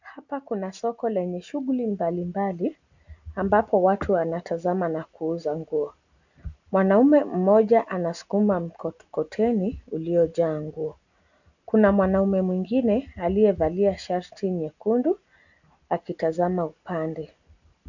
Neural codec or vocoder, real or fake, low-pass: none; real; 7.2 kHz